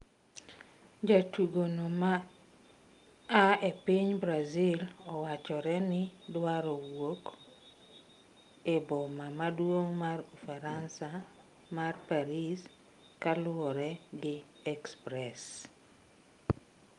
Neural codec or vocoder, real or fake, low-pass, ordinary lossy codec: none; real; 10.8 kHz; Opus, 32 kbps